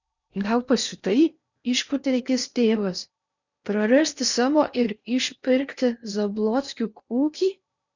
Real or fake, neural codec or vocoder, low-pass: fake; codec, 16 kHz in and 24 kHz out, 0.8 kbps, FocalCodec, streaming, 65536 codes; 7.2 kHz